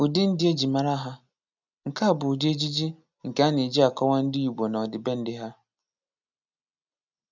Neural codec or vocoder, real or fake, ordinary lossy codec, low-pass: none; real; none; 7.2 kHz